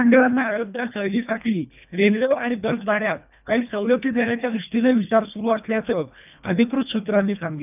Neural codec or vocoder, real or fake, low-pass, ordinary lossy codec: codec, 24 kHz, 1.5 kbps, HILCodec; fake; 3.6 kHz; none